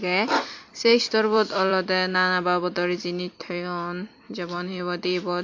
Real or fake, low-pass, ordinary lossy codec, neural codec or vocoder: fake; 7.2 kHz; AAC, 48 kbps; vocoder, 44.1 kHz, 128 mel bands every 256 samples, BigVGAN v2